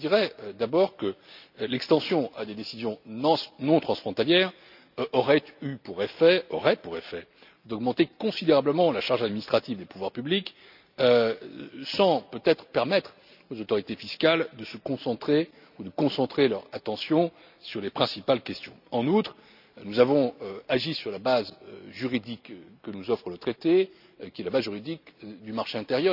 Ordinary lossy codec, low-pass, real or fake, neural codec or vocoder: none; 5.4 kHz; real; none